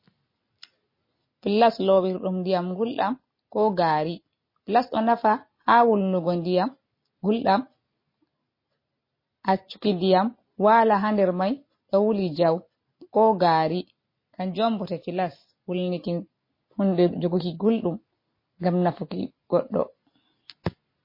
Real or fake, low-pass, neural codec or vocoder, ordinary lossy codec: real; 5.4 kHz; none; MP3, 24 kbps